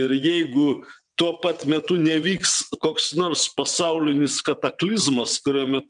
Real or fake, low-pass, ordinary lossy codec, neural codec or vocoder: fake; 9.9 kHz; MP3, 96 kbps; vocoder, 22.05 kHz, 80 mel bands, WaveNeXt